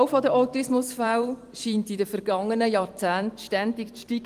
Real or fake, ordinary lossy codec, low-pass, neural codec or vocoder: fake; Opus, 24 kbps; 14.4 kHz; vocoder, 44.1 kHz, 128 mel bands every 256 samples, BigVGAN v2